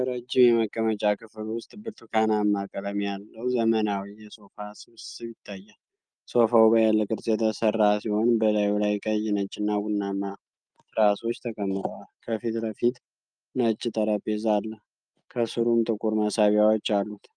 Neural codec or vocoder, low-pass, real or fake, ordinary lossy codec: none; 9.9 kHz; real; Opus, 32 kbps